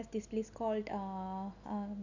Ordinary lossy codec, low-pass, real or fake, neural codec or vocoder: none; 7.2 kHz; real; none